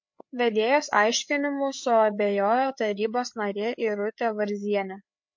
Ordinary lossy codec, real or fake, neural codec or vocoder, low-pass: MP3, 48 kbps; fake; codec, 16 kHz, 4 kbps, FreqCodec, larger model; 7.2 kHz